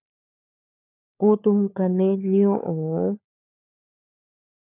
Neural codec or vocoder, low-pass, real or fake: codec, 16 kHz, 4 kbps, FunCodec, trained on LibriTTS, 50 frames a second; 3.6 kHz; fake